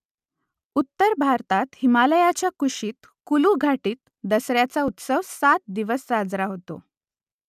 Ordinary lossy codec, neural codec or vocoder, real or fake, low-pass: none; none; real; 14.4 kHz